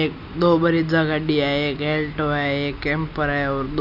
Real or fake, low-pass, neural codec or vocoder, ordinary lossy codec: real; 5.4 kHz; none; none